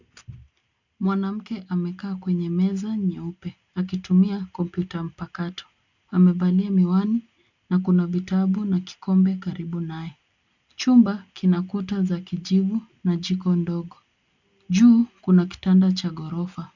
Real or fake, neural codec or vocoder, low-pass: real; none; 7.2 kHz